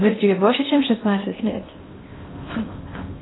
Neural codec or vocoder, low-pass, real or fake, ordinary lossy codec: codec, 16 kHz in and 24 kHz out, 0.6 kbps, FocalCodec, streaming, 4096 codes; 7.2 kHz; fake; AAC, 16 kbps